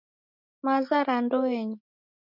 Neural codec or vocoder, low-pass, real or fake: none; 5.4 kHz; real